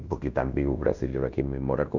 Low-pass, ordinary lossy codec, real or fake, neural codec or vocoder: 7.2 kHz; none; fake; codec, 16 kHz in and 24 kHz out, 0.9 kbps, LongCat-Audio-Codec, fine tuned four codebook decoder